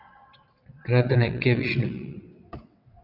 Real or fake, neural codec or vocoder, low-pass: fake; vocoder, 22.05 kHz, 80 mel bands, WaveNeXt; 5.4 kHz